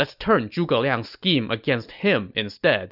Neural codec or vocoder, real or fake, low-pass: none; real; 5.4 kHz